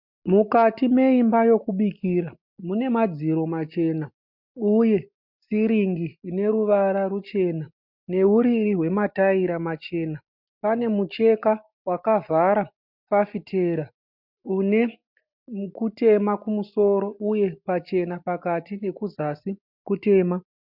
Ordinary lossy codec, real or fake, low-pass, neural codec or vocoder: MP3, 48 kbps; real; 5.4 kHz; none